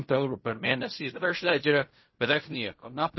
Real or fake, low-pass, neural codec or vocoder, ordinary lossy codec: fake; 7.2 kHz; codec, 16 kHz in and 24 kHz out, 0.4 kbps, LongCat-Audio-Codec, fine tuned four codebook decoder; MP3, 24 kbps